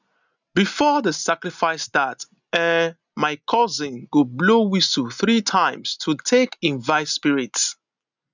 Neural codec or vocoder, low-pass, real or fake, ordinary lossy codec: none; 7.2 kHz; real; none